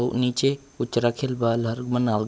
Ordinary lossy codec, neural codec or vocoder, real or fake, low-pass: none; none; real; none